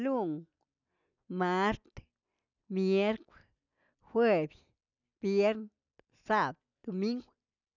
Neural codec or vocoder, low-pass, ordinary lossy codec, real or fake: none; 7.2 kHz; none; real